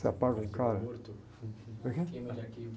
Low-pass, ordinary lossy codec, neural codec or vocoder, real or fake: none; none; none; real